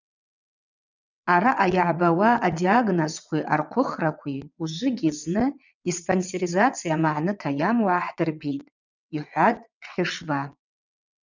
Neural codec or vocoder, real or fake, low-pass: vocoder, 22.05 kHz, 80 mel bands, WaveNeXt; fake; 7.2 kHz